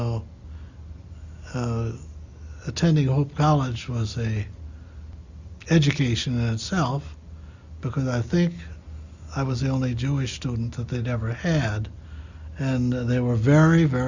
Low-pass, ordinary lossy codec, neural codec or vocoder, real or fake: 7.2 kHz; Opus, 64 kbps; none; real